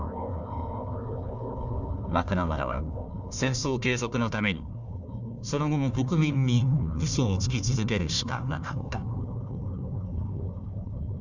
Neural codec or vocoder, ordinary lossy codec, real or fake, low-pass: codec, 16 kHz, 1 kbps, FunCodec, trained on Chinese and English, 50 frames a second; none; fake; 7.2 kHz